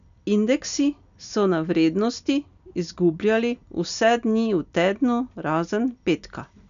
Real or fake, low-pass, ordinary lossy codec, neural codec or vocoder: real; 7.2 kHz; none; none